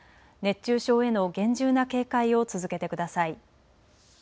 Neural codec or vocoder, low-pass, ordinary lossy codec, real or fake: none; none; none; real